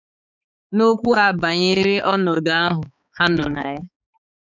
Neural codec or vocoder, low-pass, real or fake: codec, 16 kHz, 4 kbps, X-Codec, HuBERT features, trained on balanced general audio; 7.2 kHz; fake